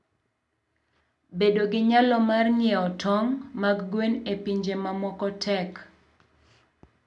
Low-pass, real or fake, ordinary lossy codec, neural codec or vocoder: 10.8 kHz; real; none; none